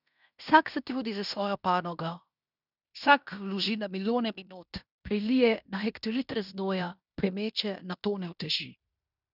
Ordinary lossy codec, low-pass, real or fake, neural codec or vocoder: none; 5.4 kHz; fake; codec, 16 kHz in and 24 kHz out, 0.9 kbps, LongCat-Audio-Codec, fine tuned four codebook decoder